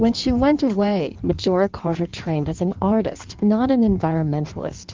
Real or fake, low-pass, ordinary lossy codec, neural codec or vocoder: fake; 7.2 kHz; Opus, 32 kbps; codec, 16 kHz in and 24 kHz out, 1.1 kbps, FireRedTTS-2 codec